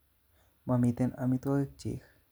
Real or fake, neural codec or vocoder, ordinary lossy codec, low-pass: real; none; none; none